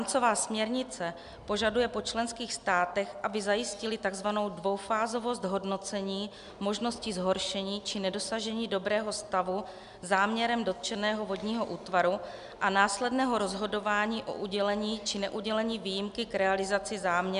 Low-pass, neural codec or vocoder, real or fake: 10.8 kHz; none; real